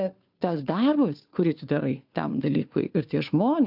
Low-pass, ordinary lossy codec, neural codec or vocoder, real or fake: 5.4 kHz; AAC, 48 kbps; codec, 16 kHz, 2 kbps, FunCodec, trained on Chinese and English, 25 frames a second; fake